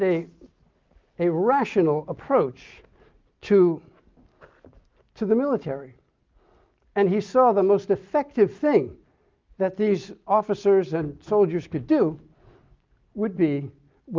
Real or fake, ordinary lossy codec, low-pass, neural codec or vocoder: real; Opus, 32 kbps; 7.2 kHz; none